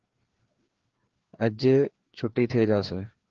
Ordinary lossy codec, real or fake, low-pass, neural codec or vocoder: Opus, 16 kbps; fake; 7.2 kHz; codec, 16 kHz, 2 kbps, FreqCodec, larger model